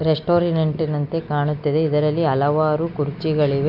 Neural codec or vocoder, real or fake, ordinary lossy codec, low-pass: none; real; none; 5.4 kHz